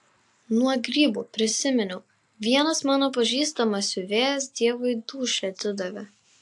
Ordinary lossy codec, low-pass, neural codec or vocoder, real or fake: AAC, 64 kbps; 10.8 kHz; none; real